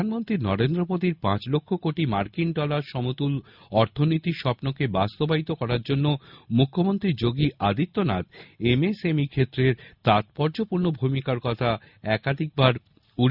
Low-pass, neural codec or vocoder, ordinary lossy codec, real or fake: 5.4 kHz; none; none; real